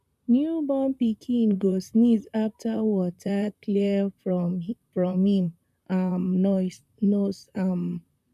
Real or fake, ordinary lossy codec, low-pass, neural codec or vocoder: fake; none; 14.4 kHz; vocoder, 44.1 kHz, 128 mel bands, Pupu-Vocoder